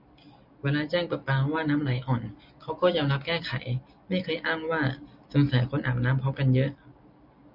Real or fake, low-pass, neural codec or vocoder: real; 5.4 kHz; none